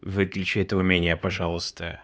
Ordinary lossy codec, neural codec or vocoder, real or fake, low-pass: none; codec, 16 kHz, 4 kbps, X-Codec, HuBERT features, trained on LibriSpeech; fake; none